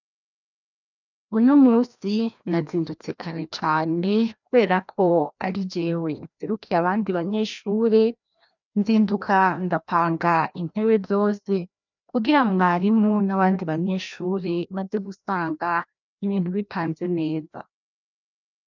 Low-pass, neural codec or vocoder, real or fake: 7.2 kHz; codec, 16 kHz, 1 kbps, FreqCodec, larger model; fake